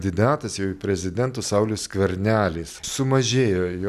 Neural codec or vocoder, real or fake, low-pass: none; real; 14.4 kHz